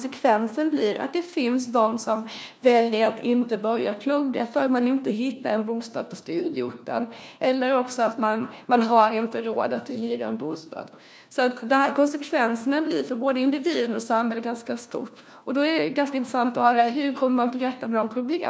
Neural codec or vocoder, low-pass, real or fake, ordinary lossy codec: codec, 16 kHz, 1 kbps, FunCodec, trained on LibriTTS, 50 frames a second; none; fake; none